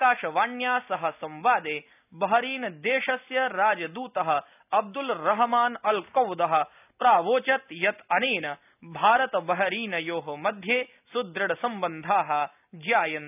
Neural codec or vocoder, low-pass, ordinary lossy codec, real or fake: none; 3.6 kHz; none; real